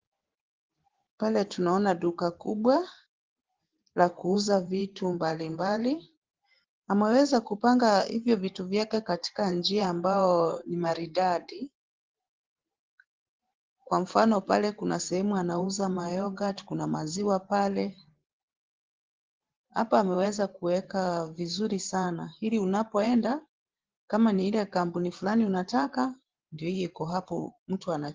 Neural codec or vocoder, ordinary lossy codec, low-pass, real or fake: vocoder, 44.1 kHz, 128 mel bands every 512 samples, BigVGAN v2; Opus, 16 kbps; 7.2 kHz; fake